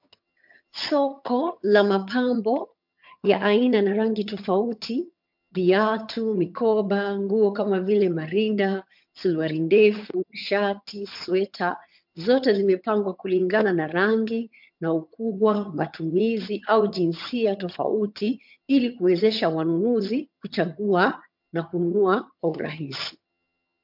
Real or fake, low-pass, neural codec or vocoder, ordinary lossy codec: fake; 5.4 kHz; vocoder, 22.05 kHz, 80 mel bands, HiFi-GAN; MP3, 48 kbps